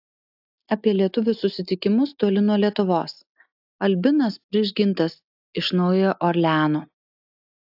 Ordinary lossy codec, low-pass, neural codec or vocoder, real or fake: AAC, 48 kbps; 5.4 kHz; none; real